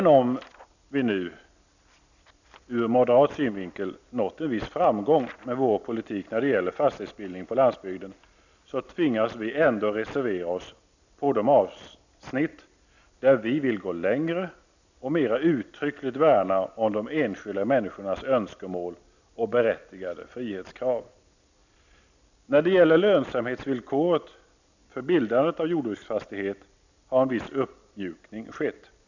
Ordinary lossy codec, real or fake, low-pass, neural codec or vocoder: none; real; 7.2 kHz; none